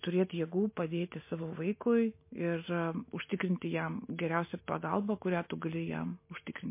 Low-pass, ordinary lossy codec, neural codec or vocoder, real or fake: 3.6 kHz; MP3, 24 kbps; none; real